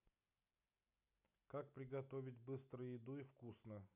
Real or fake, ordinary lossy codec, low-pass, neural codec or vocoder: real; none; 3.6 kHz; none